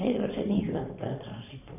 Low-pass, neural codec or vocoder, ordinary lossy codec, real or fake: 3.6 kHz; codec, 16 kHz in and 24 kHz out, 2.2 kbps, FireRedTTS-2 codec; none; fake